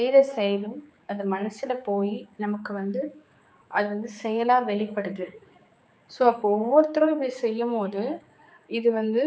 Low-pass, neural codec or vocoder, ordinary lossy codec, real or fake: none; codec, 16 kHz, 4 kbps, X-Codec, HuBERT features, trained on general audio; none; fake